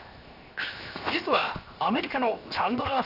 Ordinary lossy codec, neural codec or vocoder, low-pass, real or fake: none; codec, 16 kHz, 0.7 kbps, FocalCodec; 5.4 kHz; fake